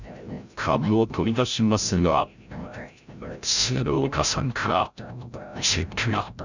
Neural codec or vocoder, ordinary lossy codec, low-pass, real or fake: codec, 16 kHz, 0.5 kbps, FreqCodec, larger model; none; 7.2 kHz; fake